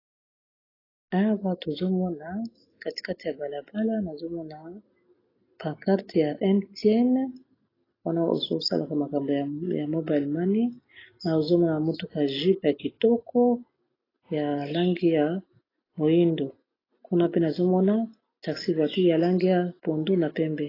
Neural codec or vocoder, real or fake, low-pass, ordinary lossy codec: none; real; 5.4 kHz; AAC, 24 kbps